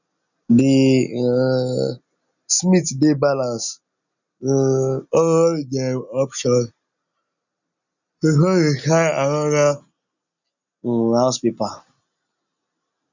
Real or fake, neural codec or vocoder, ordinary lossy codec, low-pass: real; none; none; 7.2 kHz